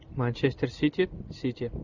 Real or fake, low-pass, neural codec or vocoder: real; 7.2 kHz; none